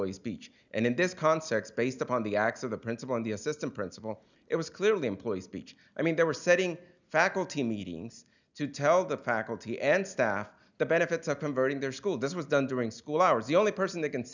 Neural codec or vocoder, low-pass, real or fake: none; 7.2 kHz; real